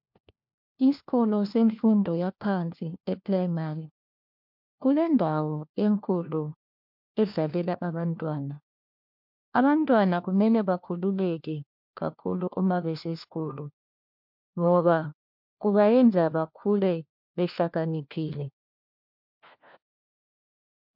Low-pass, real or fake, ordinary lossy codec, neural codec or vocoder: 5.4 kHz; fake; MP3, 48 kbps; codec, 16 kHz, 1 kbps, FunCodec, trained on LibriTTS, 50 frames a second